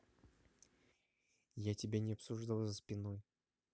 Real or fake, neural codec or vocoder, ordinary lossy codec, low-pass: real; none; none; none